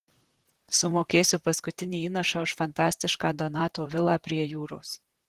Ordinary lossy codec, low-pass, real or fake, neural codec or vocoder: Opus, 16 kbps; 14.4 kHz; fake; vocoder, 44.1 kHz, 128 mel bands, Pupu-Vocoder